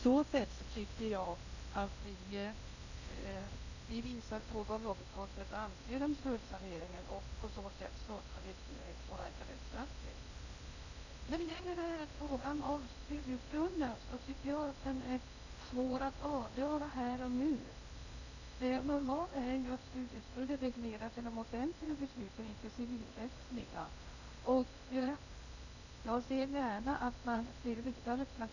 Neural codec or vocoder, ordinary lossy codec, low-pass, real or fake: codec, 16 kHz in and 24 kHz out, 0.6 kbps, FocalCodec, streaming, 2048 codes; none; 7.2 kHz; fake